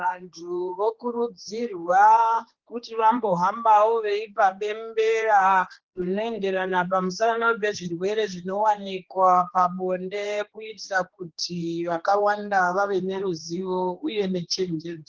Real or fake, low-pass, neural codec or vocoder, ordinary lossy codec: fake; 7.2 kHz; codec, 16 kHz, 2 kbps, X-Codec, HuBERT features, trained on general audio; Opus, 16 kbps